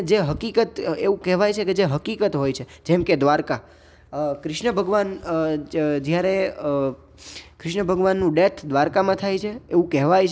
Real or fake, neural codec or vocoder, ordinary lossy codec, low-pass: real; none; none; none